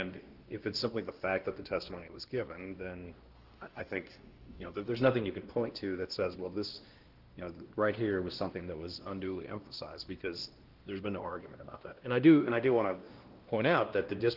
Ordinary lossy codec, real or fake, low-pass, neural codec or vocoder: Opus, 16 kbps; fake; 5.4 kHz; codec, 16 kHz, 1 kbps, X-Codec, WavLM features, trained on Multilingual LibriSpeech